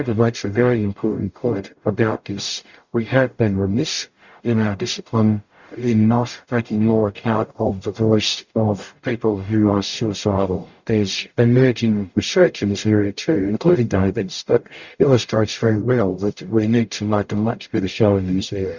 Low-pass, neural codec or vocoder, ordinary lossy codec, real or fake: 7.2 kHz; codec, 44.1 kHz, 0.9 kbps, DAC; Opus, 64 kbps; fake